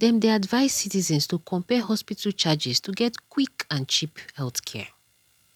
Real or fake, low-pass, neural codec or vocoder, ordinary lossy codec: real; 19.8 kHz; none; none